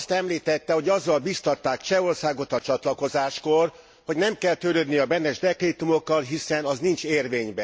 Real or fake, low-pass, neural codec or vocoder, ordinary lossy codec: real; none; none; none